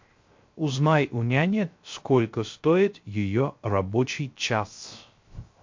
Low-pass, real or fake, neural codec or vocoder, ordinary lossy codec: 7.2 kHz; fake; codec, 16 kHz, 0.3 kbps, FocalCodec; MP3, 48 kbps